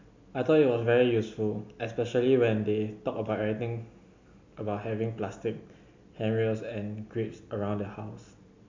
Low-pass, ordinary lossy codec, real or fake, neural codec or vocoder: 7.2 kHz; MP3, 64 kbps; real; none